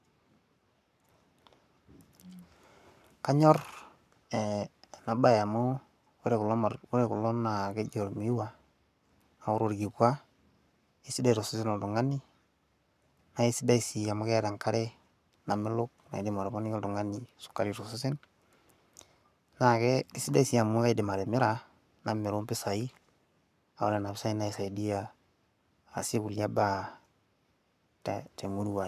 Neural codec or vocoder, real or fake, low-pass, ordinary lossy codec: codec, 44.1 kHz, 7.8 kbps, Pupu-Codec; fake; 14.4 kHz; none